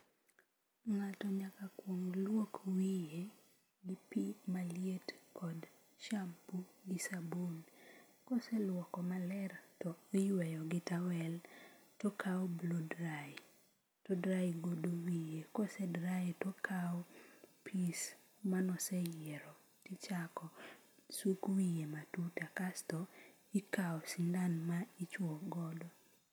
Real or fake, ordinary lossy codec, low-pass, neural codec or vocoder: real; none; none; none